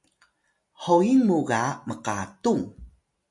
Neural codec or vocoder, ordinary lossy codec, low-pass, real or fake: none; MP3, 48 kbps; 10.8 kHz; real